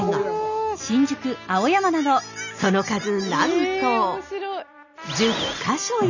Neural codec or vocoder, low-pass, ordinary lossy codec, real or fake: none; 7.2 kHz; none; real